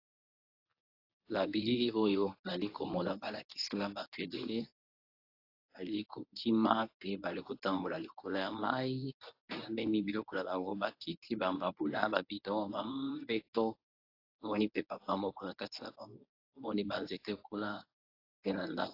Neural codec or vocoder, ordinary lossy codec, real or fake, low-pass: codec, 24 kHz, 0.9 kbps, WavTokenizer, medium speech release version 1; AAC, 32 kbps; fake; 5.4 kHz